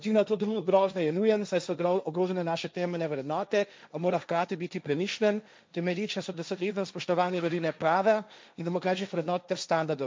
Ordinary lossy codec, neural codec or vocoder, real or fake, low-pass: none; codec, 16 kHz, 1.1 kbps, Voila-Tokenizer; fake; none